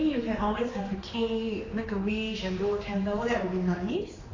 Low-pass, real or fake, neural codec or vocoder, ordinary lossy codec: 7.2 kHz; fake; codec, 16 kHz, 2 kbps, X-Codec, HuBERT features, trained on general audio; AAC, 32 kbps